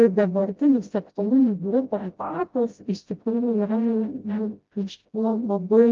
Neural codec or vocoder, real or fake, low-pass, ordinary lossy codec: codec, 16 kHz, 0.5 kbps, FreqCodec, smaller model; fake; 7.2 kHz; Opus, 32 kbps